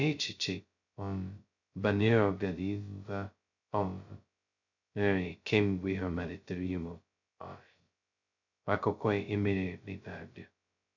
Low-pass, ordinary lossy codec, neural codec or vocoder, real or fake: 7.2 kHz; none; codec, 16 kHz, 0.2 kbps, FocalCodec; fake